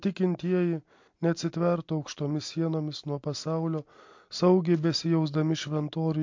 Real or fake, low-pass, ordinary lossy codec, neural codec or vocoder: real; 7.2 kHz; MP3, 48 kbps; none